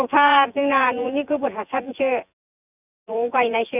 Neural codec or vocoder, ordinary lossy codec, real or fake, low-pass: vocoder, 24 kHz, 100 mel bands, Vocos; none; fake; 3.6 kHz